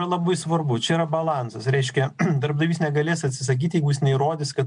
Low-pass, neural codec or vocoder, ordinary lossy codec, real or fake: 9.9 kHz; none; MP3, 96 kbps; real